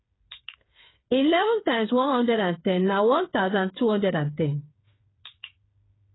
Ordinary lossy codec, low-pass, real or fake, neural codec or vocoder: AAC, 16 kbps; 7.2 kHz; fake; codec, 16 kHz, 8 kbps, FreqCodec, smaller model